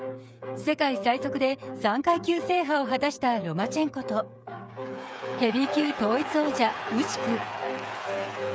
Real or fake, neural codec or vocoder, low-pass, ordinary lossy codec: fake; codec, 16 kHz, 8 kbps, FreqCodec, smaller model; none; none